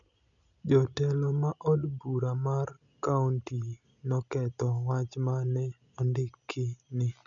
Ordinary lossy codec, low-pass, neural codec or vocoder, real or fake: none; 7.2 kHz; none; real